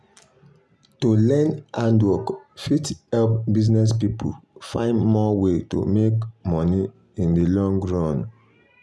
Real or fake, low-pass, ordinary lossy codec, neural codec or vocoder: real; none; none; none